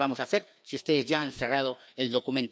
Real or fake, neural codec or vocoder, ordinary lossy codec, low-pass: fake; codec, 16 kHz, 2 kbps, FreqCodec, larger model; none; none